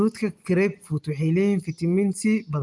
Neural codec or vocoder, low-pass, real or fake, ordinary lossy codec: none; 10.8 kHz; real; Opus, 32 kbps